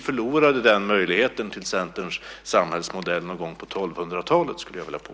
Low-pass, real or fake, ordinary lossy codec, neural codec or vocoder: none; real; none; none